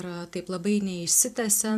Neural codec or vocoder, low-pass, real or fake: vocoder, 44.1 kHz, 128 mel bands every 256 samples, BigVGAN v2; 14.4 kHz; fake